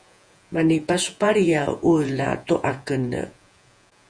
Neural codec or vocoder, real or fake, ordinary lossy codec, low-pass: vocoder, 48 kHz, 128 mel bands, Vocos; fake; Opus, 64 kbps; 9.9 kHz